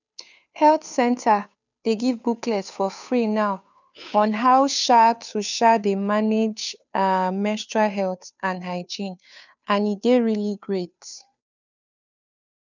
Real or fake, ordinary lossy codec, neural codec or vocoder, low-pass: fake; none; codec, 16 kHz, 2 kbps, FunCodec, trained on Chinese and English, 25 frames a second; 7.2 kHz